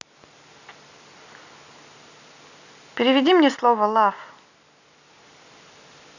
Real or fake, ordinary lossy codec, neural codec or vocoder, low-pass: real; none; none; 7.2 kHz